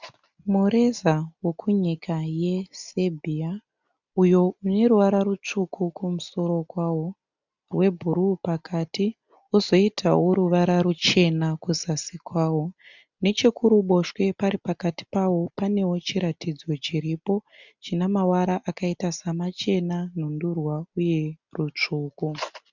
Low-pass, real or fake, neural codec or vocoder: 7.2 kHz; real; none